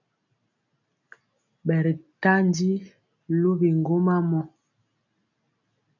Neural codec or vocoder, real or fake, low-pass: none; real; 7.2 kHz